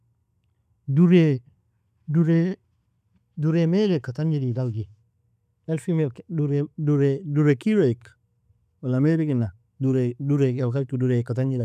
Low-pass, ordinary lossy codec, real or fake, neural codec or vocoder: 14.4 kHz; none; real; none